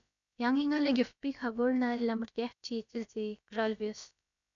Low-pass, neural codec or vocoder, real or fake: 7.2 kHz; codec, 16 kHz, about 1 kbps, DyCAST, with the encoder's durations; fake